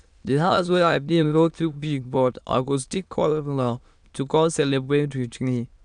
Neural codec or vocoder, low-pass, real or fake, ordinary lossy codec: autoencoder, 22.05 kHz, a latent of 192 numbers a frame, VITS, trained on many speakers; 9.9 kHz; fake; none